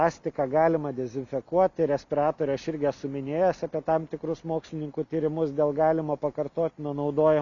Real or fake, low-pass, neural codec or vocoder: real; 7.2 kHz; none